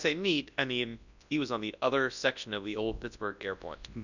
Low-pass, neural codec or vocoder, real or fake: 7.2 kHz; codec, 24 kHz, 0.9 kbps, WavTokenizer, large speech release; fake